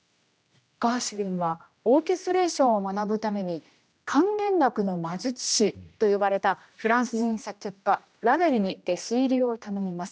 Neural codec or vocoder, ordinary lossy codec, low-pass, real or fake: codec, 16 kHz, 1 kbps, X-Codec, HuBERT features, trained on general audio; none; none; fake